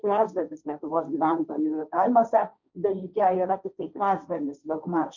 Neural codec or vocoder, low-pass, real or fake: codec, 16 kHz, 1.1 kbps, Voila-Tokenizer; 7.2 kHz; fake